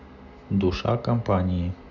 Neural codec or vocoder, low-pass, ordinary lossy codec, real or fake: none; 7.2 kHz; none; real